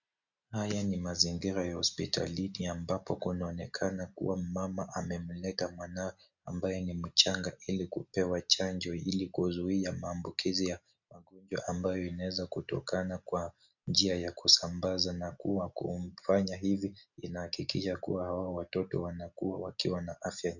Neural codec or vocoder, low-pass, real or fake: none; 7.2 kHz; real